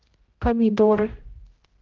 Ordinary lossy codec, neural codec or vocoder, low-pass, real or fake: Opus, 16 kbps; codec, 16 kHz, 0.5 kbps, X-Codec, HuBERT features, trained on general audio; 7.2 kHz; fake